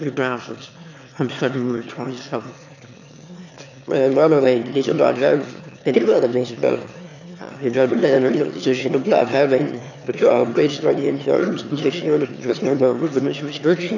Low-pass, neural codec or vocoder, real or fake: 7.2 kHz; autoencoder, 22.05 kHz, a latent of 192 numbers a frame, VITS, trained on one speaker; fake